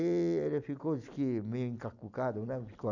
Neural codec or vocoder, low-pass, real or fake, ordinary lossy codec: none; 7.2 kHz; real; none